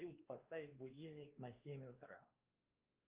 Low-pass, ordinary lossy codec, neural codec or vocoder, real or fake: 3.6 kHz; Opus, 32 kbps; codec, 16 kHz, 2 kbps, X-Codec, WavLM features, trained on Multilingual LibriSpeech; fake